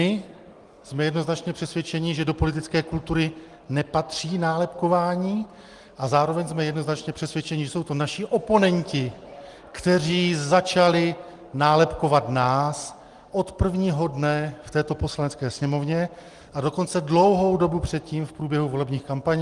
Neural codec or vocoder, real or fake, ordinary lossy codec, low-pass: none; real; Opus, 24 kbps; 10.8 kHz